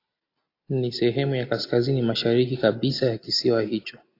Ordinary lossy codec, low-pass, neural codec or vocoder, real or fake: AAC, 32 kbps; 5.4 kHz; none; real